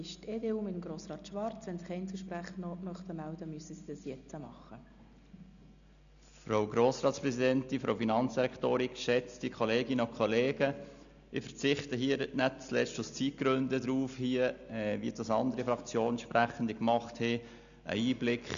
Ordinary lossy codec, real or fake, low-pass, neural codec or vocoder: none; real; 7.2 kHz; none